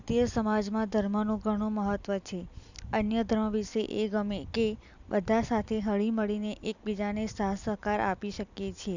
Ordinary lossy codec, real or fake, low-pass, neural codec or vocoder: none; fake; 7.2 kHz; vocoder, 44.1 kHz, 128 mel bands every 256 samples, BigVGAN v2